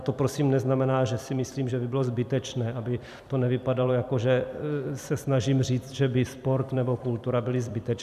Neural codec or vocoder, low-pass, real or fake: vocoder, 44.1 kHz, 128 mel bands every 256 samples, BigVGAN v2; 14.4 kHz; fake